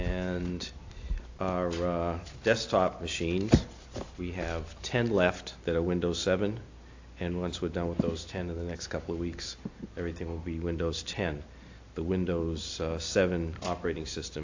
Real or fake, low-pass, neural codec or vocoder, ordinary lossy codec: real; 7.2 kHz; none; AAC, 48 kbps